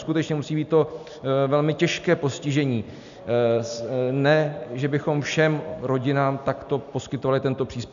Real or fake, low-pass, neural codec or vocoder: real; 7.2 kHz; none